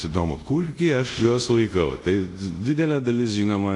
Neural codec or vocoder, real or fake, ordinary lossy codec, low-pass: codec, 24 kHz, 0.5 kbps, DualCodec; fake; AAC, 48 kbps; 10.8 kHz